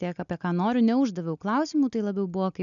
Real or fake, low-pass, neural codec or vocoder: real; 7.2 kHz; none